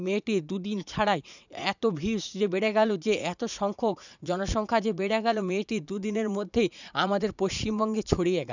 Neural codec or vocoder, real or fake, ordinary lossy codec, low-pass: vocoder, 44.1 kHz, 80 mel bands, Vocos; fake; none; 7.2 kHz